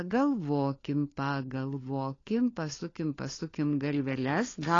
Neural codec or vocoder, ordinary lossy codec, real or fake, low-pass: codec, 16 kHz, 4 kbps, FunCodec, trained on LibriTTS, 50 frames a second; AAC, 32 kbps; fake; 7.2 kHz